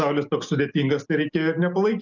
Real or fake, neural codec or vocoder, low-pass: real; none; 7.2 kHz